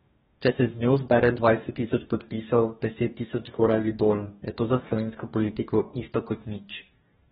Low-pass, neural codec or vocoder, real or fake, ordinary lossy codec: 19.8 kHz; codec, 44.1 kHz, 2.6 kbps, DAC; fake; AAC, 16 kbps